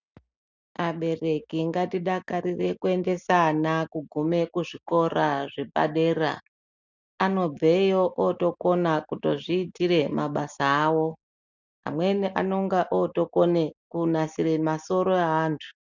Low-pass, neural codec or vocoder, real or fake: 7.2 kHz; none; real